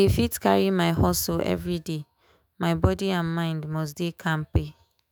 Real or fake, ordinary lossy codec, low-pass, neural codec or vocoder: fake; none; none; autoencoder, 48 kHz, 128 numbers a frame, DAC-VAE, trained on Japanese speech